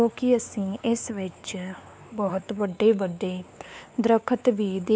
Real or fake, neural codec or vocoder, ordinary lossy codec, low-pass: fake; codec, 16 kHz, 4 kbps, X-Codec, WavLM features, trained on Multilingual LibriSpeech; none; none